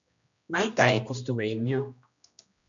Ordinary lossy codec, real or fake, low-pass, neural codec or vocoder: MP3, 64 kbps; fake; 7.2 kHz; codec, 16 kHz, 1 kbps, X-Codec, HuBERT features, trained on general audio